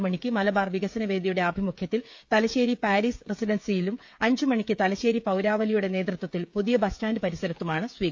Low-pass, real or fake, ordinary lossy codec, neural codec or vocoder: none; fake; none; codec, 16 kHz, 16 kbps, FreqCodec, smaller model